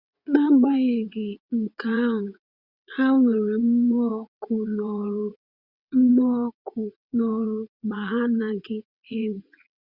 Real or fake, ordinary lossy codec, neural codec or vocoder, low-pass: fake; Opus, 64 kbps; vocoder, 22.05 kHz, 80 mel bands, WaveNeXt; 5.4 kHz